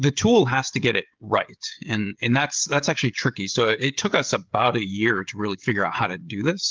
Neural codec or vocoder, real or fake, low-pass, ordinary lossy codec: codec, 16 kHz, 16 kbps, FreqCodec, smaller model; fake; 7.2 kHz; Opus, 32 kbps